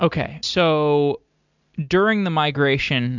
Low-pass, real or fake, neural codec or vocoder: 7.2 kHz; real; none